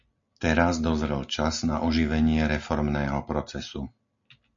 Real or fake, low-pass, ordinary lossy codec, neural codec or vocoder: real; 7.2 kHz; MP3, 48 kbps; none